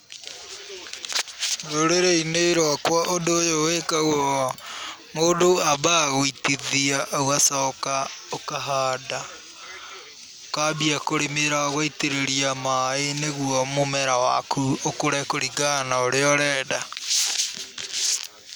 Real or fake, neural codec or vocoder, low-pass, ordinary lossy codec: real; none; none; none